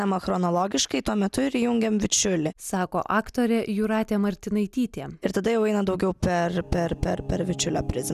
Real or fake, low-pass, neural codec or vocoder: real; 14.4 kHz; none